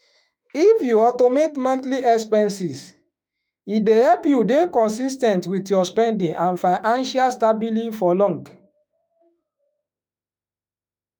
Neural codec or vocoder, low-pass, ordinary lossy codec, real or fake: autoencoder, 48 kHz, 32 numbers a frame, DAC-VAE, trained on Japanese speech; none; none; fake